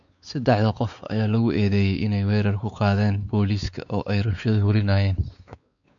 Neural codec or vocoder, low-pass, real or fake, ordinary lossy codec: codec, 16 kHz, 4 kbps, X-Codec, WavLM features, trained on Multilingual LibriSpeech; 7.2 kHz; fake; none